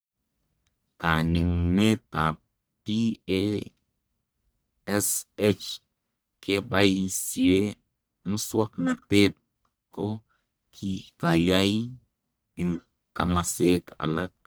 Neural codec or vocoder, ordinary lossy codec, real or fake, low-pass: codec, 44.1 kHz, 1.7 kbps, Pupu-Codec; none; fake; none